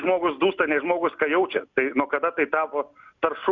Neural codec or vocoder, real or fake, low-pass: none; real; 7.2 kHz